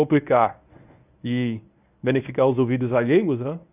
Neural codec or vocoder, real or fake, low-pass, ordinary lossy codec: codec, 24 kHz, 0.9 kbps, WavTokenizer, medium speech release version 1; fake; 3.6 kHz; none